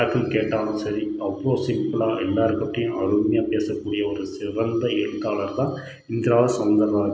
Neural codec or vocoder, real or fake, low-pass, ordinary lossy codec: none; real; none; none